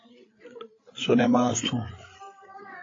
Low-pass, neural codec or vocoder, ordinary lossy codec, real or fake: 7.2 kHz; codec, 16 kHz, 8 kbps, FreqCodec, larger model; AAC, 32 kbps; fake